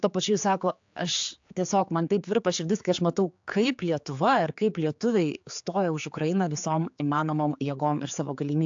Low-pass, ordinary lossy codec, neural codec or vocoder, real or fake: 7.2 kHz; AAC, 64 kbps; codec, 16 kHz, 4 kbps, X-Codec, HuBERT features, trained on general audio; fake